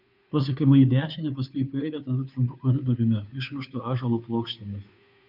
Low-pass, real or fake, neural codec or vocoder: 5.4 kHz; fake; codec, 16 kHz, 2 kbps, FunCodec, trained on Chinese and English, 25 frames a second